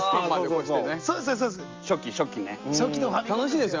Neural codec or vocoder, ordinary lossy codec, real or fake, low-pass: none; Opus, 32 kbps; real; 7.2 kHz